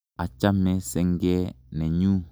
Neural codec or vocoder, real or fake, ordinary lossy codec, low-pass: none; real; none; none